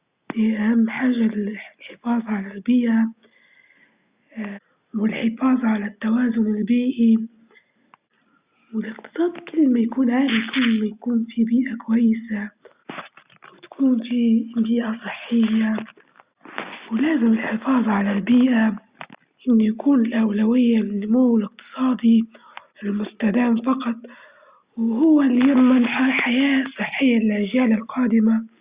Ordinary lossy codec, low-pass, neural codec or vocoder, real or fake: Opus, 64 kbps; 3.6 kHz; none; real